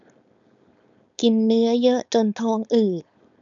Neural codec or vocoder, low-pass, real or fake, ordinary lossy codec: codec, 16 kHz, 4.8 kbps, FACodec; 7.2 kHz; fake; none